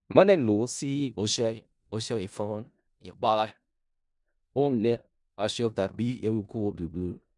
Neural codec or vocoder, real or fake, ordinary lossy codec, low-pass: codec, 16 kHz in and 24 kHz out, 0.4 kbps, LongCat-Audio-Codec, four codebook decoder; fake; none; 10.8 kHz